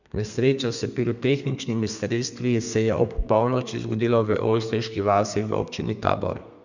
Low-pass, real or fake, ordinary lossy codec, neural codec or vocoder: 7.2 kHz; fake; none; codec, 32 kHz, 1.9 kbps, SNAC